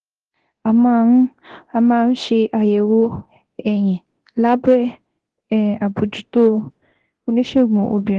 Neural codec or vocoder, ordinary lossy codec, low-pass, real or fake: codec, 24 kHz, 0.9 kbps, DualCodec; Opus, 16 kbps; 10.8 kHz; fake